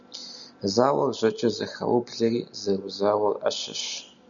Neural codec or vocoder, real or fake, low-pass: none; real; 7.2 kHz